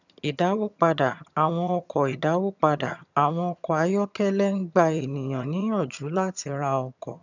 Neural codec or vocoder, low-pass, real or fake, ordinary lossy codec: vocoder, 22.05 kHz, 80 mel bands, HiFi-GAN; 7.2 kHz; fake; none